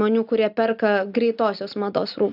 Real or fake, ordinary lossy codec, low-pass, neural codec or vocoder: real; AAC, 48 kbps; 5.4 kHz; none